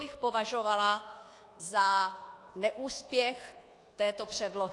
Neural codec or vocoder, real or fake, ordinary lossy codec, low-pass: codec, 24 kHz, 1.2 kbps, DualCodec; fake; AAC, 48 kbps; 10.8 kHz